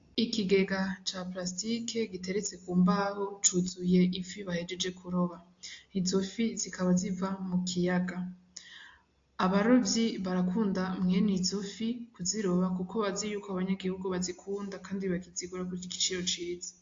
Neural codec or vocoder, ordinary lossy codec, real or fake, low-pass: none; AAC, 48 kbps; real; 7.2 kHz